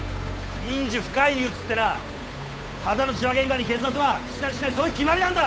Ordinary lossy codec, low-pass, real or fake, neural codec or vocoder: none; none; fake; codec, 16 kHz, 8 kbps, FunCodec, trained on Chinese and English, 25 frames a second